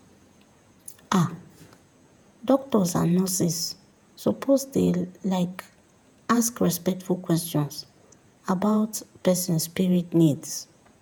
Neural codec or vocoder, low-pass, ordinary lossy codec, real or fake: vocoder, 48 kHz, 128 mel bands, Vocos; none; none; fake